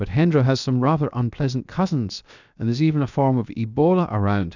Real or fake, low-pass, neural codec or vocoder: fake; 7.2 kHz; codec, 16 kHz, 0.7 kbps, FocalCodec